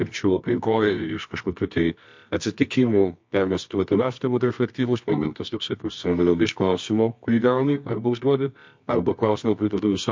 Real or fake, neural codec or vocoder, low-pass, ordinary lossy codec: fake; codec, 24 kHz, 0.9 kbps, WavTokenizer, medium music audio release; 7.2 kHz; MP3, 48 kbps